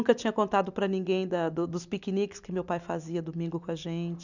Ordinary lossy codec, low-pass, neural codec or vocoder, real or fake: none; 7.2 kHz; none; real